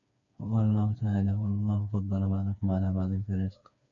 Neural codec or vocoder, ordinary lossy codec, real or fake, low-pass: codec, 16 kHz, 4 kbps, FreqCodec, smaller model; AAC, 64 kbps; fake; 7.2 kHz